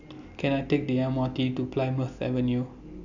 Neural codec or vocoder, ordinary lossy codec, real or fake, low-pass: none; none; real; 7.2 kHz